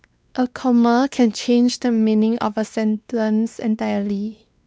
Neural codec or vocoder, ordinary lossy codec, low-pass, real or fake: codec, 16 kHz, 2 kbps, X-Codec, WavLM features, trained on Multilingual LibriSpeech; none; none; fake